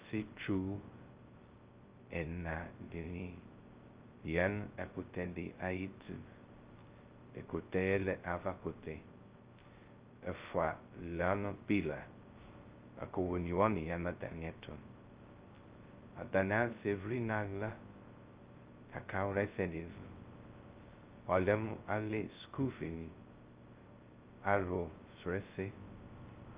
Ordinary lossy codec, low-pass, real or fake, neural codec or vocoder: Opus, 24 kbps; 3.6 kHz; fake; codec, 16 kHz, 0.2 kbps, FocalCodec